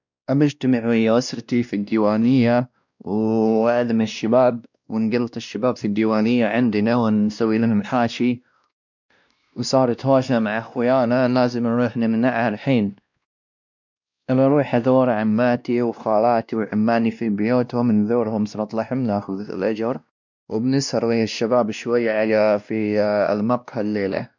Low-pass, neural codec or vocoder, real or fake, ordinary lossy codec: 7.2 kHz; codec, 16 kHz, 1 kbps, X-Codec, WavLM features, trained on Multilingual LibriSpeech; fake; none